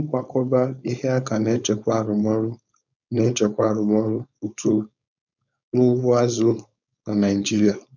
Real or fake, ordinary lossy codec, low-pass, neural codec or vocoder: fake; none; 7.2 kHz; codec, 16 kHz, 4.8 kbps, FACodec